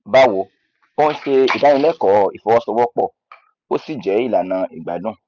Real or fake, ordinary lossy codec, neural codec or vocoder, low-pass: real; none; none; 7.2 kHz